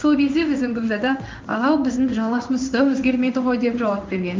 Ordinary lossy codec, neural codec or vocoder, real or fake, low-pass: Opus, 24 kbps; codec, 16 kHz in and 24 kHz out, 1 kbps, XY-Tokenizer; fake; 7.2 kHz